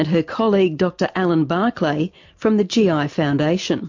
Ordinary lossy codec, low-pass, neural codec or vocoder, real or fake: MP3, 48 kbps; 7.2 kHz; none; real